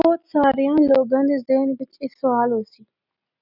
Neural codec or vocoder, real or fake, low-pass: none; real; 5.4 kHz